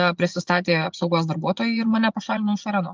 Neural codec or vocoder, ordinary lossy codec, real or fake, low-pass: vocoder, 24 kHz, 100 mel bands, Vocos; Opus, 24 kbps; fake; 7.2 kHz